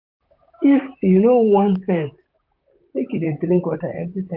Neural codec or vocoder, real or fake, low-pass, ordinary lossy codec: vocoder, 44.1 kHz, 128 mel bands, Pupu-Vocoder; fake; 5.4 kHz; AAC, 48 kbps